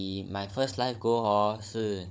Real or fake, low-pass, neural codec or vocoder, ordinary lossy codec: fake; none; codec, 16 kHz, 16 kbps, FunCodec, trained on Chinese and English, 50 frames a second; none